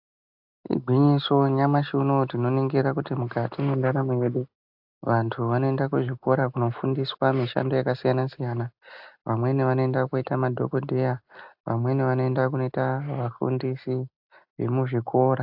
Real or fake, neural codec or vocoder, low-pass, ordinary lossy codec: real; none; 5.4 kHz; AAC, 48 kbps